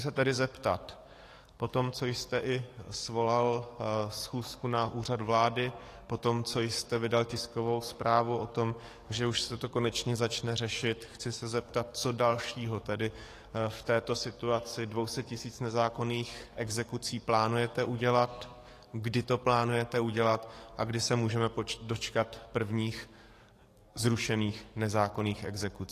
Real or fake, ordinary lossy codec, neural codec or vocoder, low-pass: fake; AAC, 48 kbps; codec, 44.1 kHz, 7.8 kbps, DAC; 14.4 kHz